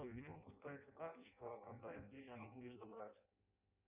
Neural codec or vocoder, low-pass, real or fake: codec, 16 kHz in and 24 kHz out, 0.6 kbps, FireRedTTS-2 codec; 3.6 kHz; fake